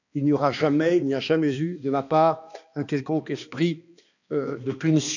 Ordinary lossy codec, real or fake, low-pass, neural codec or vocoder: none; fake; 7.2 kHz; codec, 16 kHz, 2 kbps, X-Codec, HuBERT features, trained on balanced general audio